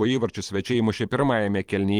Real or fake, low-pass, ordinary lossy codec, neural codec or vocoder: fake; 19.8 kHz; Opus, 24 kbps; vocoder, 44.1 kHz, 128 mel bands every 512 samples, BigVGAN v2